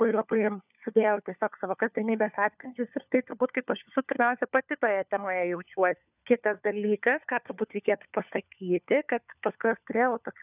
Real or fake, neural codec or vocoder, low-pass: fake; codec, 16 kHz, 4 kbps, FunCodec, trained on LibriTTS, 50 frames a second; 3.6 kHz